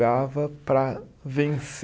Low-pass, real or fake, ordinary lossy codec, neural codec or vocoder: none; real; none; none